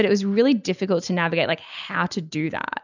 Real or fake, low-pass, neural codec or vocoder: real; 7.2 kHz; none